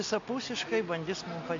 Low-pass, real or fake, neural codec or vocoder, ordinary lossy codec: 7.2 kHz; real; none; MP3, 48 kbps